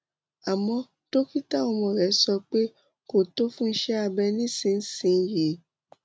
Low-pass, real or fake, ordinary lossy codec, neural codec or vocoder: none; real; none; none